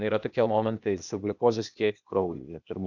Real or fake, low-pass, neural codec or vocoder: fake; 7.2 kHz; codec, 16 kHz, 0.8 kbps, ZipCodec